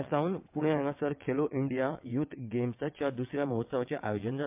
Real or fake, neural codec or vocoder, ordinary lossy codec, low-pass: fake; vocoder, 22.05 kHz, 80 mel bands, WaveNeXt; MP3, 32 kbps; 3.6 kHz